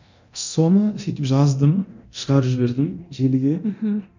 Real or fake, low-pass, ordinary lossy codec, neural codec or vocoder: fake; 7.2 kHz; none; codec, 24 kHz, 0.9 kbps, DualCodec